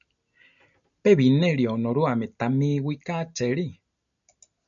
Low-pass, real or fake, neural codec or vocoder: 7.2 kHz; real; none